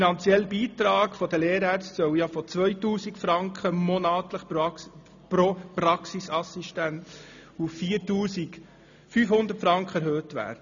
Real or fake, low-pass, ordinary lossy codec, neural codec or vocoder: real; 7.2 kHz; none; none